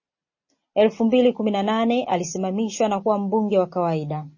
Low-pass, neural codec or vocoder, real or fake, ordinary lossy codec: 7.2 kHz; none; real; MP3, 32 kbps